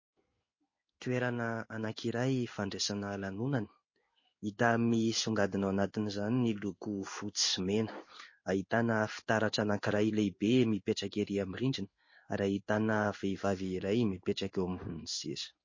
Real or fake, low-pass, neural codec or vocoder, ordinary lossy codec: fake; 7.2 kHz; codec, 16 kHz in and 24 kHz out, 1 kbps, XY-Tokenizer; MP3, 32 kbps